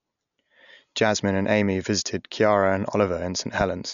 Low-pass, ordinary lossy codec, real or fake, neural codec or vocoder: 7.2 kHz; none; real; none